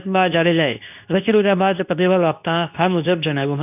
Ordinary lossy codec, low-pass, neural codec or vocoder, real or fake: none; 3.6 kHz; codec, 24 kHz, 0.9 kbps, WavTokenizer, medium speech release version 2; fake